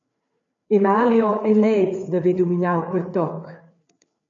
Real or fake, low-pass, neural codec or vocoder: fake; 7.2 kHz; codec, 16 kHz, 4 kbps, FreqCodec, larger model